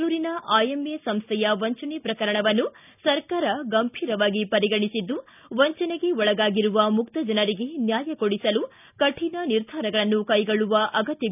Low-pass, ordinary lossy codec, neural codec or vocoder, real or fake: 3.6 kHz; none; none; real